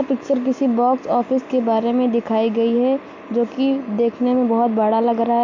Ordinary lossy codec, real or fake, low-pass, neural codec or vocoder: MP3, 48 kbps; real; 7.2 kHz; none